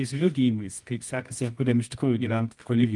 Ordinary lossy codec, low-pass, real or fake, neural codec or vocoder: Opus, 32 kbps; 10.8 kHz; fake; codec, 24 kHz, 0.9 kbps, WavTokenizer, medium music audio release